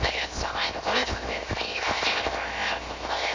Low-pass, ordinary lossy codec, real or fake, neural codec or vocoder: 7.2 kHz; MP3, 48 kbps; fake; codec, 16 kHz, 0.7 kbps, FocalCodec